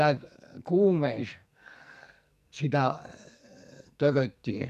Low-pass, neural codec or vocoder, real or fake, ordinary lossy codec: 14.4 kHz; codec, 44.1 kHz, 2.6 kbps, SNAC; fake; none